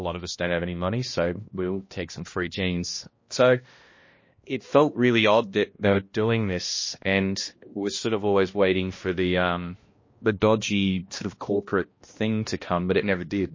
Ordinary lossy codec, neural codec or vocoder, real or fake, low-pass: MP3, 32 kbps; codec, 16 kHz, 1 kbps, X-Codec, HuBERT features, trained on balanced general audio; fake; 7.2 kHz